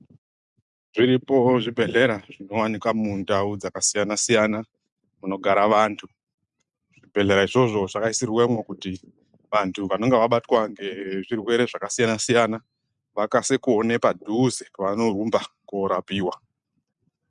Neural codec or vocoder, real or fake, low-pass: none; real; 10.8 kHz